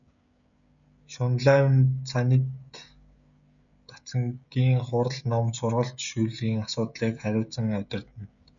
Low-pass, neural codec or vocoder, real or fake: 7.2 kHz; codec, 16 kHz, 16 kbps, FreqCodec, smaller model; fake